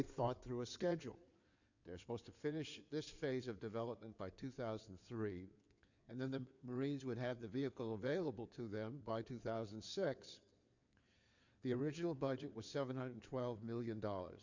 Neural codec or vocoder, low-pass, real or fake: codec, 16 kHz in and 24 kHz out, 2.2 kbps, FireRedTTS-2 codec; 7.2 kHz; fake